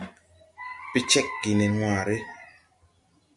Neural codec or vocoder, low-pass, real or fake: none; 10.8 kHz; real